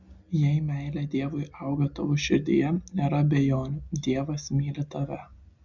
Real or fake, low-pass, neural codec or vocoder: real; 7.2 kHz; none